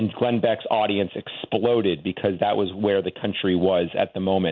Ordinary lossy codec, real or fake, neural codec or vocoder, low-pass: MP3, 64 kbps; real; none; 7.2 kHz